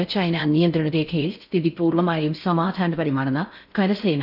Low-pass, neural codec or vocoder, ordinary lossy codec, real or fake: 5.4 kHz; codec, 16 kHz in and 24 kHz out, 0.6 kbps, FocalCodec, streaming, 4096 codes; none; fake